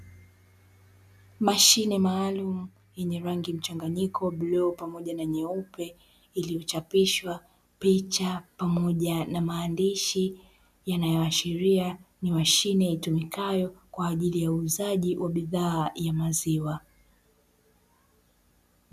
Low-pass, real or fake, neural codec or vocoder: 14.4 kHz; real; none